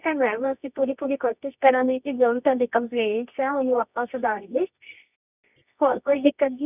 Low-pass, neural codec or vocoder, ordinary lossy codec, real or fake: 3.6 kHz; codec, 24 kHz, 0.9 kbps, WavTokenizer, medium music audio release; none; fake